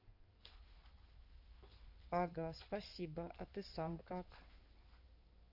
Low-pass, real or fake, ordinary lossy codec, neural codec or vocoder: 5.4 kHz; fake; none; codec, 16 kHz in and 24 kHz out, 2.2 kbps, FireRedTTS-2 codec